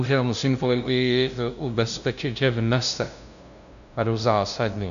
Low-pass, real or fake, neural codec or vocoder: 7.2 kHz; fake; codec, 16 kHz, 0.5 kbps, FunCodec, trained on LibriTTS, 25 frames a second